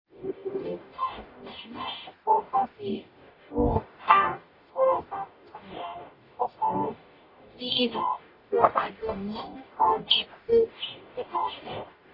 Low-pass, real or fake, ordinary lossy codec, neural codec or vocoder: 5.4 kHz; fake; AAC, 24 kbps; codec, 44.1 kHz, 0.9 kbps, DAC